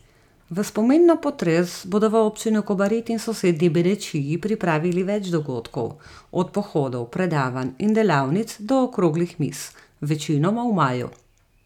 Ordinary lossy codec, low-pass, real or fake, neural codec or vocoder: none; 19.8 kHz; real; none